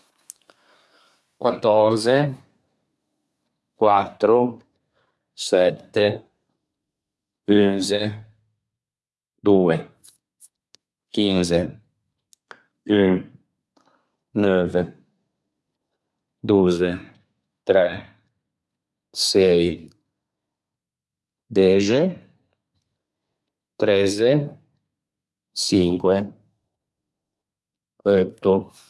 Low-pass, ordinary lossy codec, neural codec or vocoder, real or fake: none; none; codec, 24 kHz, 1 kbps, SNAC; fake